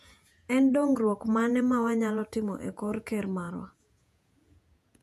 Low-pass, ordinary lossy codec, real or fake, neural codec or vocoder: 14.4 kHz; none; fake; vocoder, 48 kHz, 128 mel bands, Vocos